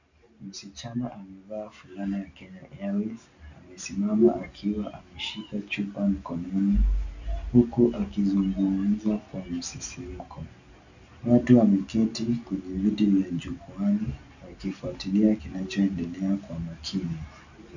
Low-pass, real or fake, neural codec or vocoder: 7.2 kHz; real; none